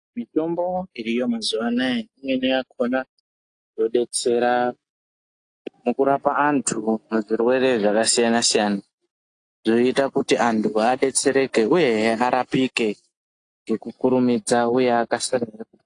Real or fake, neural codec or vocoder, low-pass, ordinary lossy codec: real; none; 9.9 kHz; AAC, 48 kbps